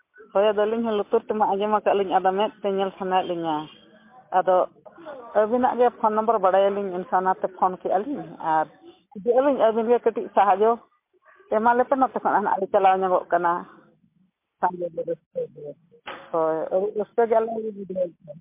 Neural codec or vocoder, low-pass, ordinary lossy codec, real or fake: none; 3.6 kHz; MP3, 24 kbps; real